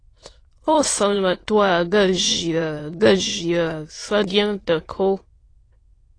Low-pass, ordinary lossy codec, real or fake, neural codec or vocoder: 9.9 kHz; AAC, 32 kbps; fake; autoencoder, 22.05 kHz, a latent of 192 numbers a frame, VITS, trained on many speakers